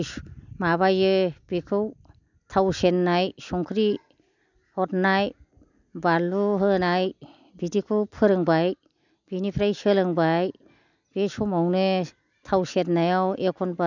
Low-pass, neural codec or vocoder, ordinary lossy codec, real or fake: 7.2 kHz; none; none; real